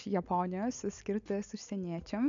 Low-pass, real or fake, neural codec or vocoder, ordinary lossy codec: 7.2 kHz; real; none; AAC, 64 kbps